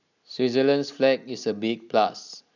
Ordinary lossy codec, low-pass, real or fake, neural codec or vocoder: none; 7.2 kHz; real; none